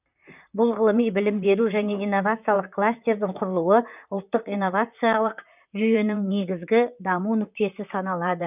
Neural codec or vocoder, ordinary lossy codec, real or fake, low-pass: vocoder, 44.1 kHz, 128 mel bands, Pupu-Vocoder; none; fake; 3.6 kHz